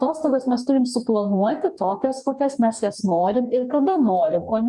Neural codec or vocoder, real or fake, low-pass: codec, 44.1 kHz, 2.6 kbps, DAC; fake; 10.8 kHz